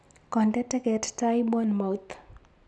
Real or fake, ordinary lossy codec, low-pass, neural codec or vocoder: real; none; none; none